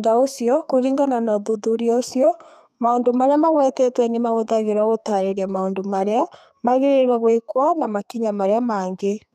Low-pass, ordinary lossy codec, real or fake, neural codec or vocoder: 14.4 kHz; none; fake; codec, 32 kHz, 1.9 kbps, SNAC